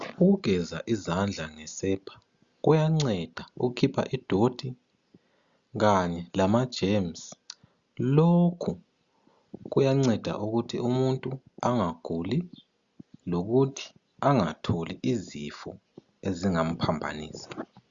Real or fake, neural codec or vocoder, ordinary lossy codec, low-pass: real; none; Opus, 64 kbps; 7.2 kHz